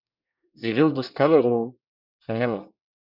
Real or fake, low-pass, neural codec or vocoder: fake; 5.4 kHz; codec, 24 kHz, 1 kbps, SNAC